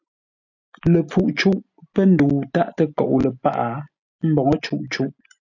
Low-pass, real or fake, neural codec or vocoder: 7.2 kHz; real; none